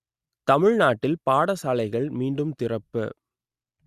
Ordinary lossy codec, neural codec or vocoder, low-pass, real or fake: Opus, 64 kbps; none; 14.4 kHz; real